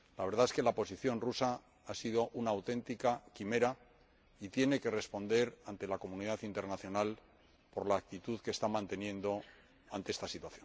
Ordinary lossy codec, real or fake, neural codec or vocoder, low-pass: none; real; none; none